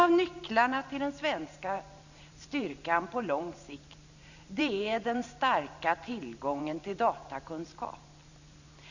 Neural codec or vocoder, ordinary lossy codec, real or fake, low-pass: vocoder, 44.1 kHz, 128 mel bands every 512 samples, BigVGAN v2; none; fake; 7.2 kHz